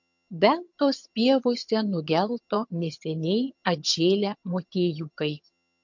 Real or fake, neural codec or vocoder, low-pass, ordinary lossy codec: fake; vocoder, 22.05 kHz, 80 mel bands, HiFi-GAN; 7.2 kHz; MP3, 48 kbps